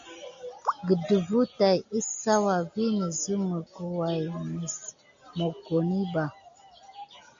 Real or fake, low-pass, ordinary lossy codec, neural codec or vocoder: real; 7.2 kHz; AAC, 64 kbps; none